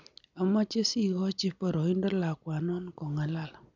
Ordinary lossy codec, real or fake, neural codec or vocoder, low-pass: none; real; none; 7.2 kHz